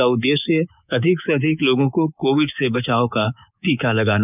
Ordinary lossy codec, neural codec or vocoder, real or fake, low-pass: none; codec, 24 kHz, 3.1 kbps, DualCodec; fake; 3.6 kHz